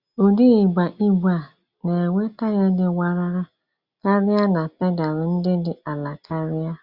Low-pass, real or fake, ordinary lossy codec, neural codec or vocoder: 5.4 kHz; real; Opus, 64 kbps; none